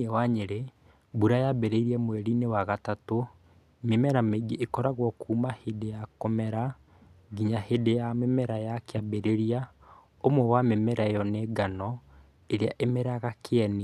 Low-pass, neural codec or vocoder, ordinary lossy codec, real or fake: 14.4 kHz; none; none; real